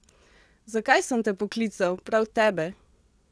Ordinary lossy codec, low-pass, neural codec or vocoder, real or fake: none; none; vocoder, 22.05 kHz, 80 mel bands, WaveNeXt; fake